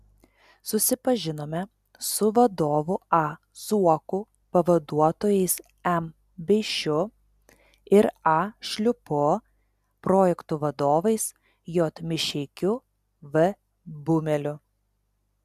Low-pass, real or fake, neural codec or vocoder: 14.4 kHz; real; none